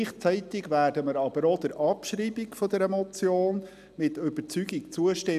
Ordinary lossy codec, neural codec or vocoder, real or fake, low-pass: AAC, 96 kbps; none; real; 14.4 kHz